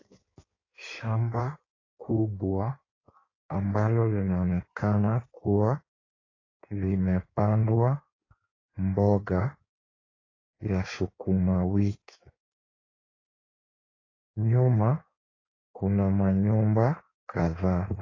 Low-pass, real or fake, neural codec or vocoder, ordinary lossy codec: 7.2 kHz; fake; codec, 16 kHz in and 24 kHz out, 1.1 kbps, FireRedTTS-2 codec; AAC, 32 kbps